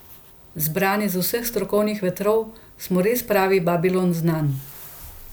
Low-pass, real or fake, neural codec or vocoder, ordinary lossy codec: none; real; none; none